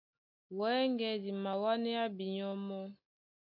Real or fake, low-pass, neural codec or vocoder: real; 5.4 kHz; none